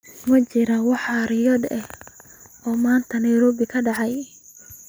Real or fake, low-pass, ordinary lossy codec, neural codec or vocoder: real; none; none; none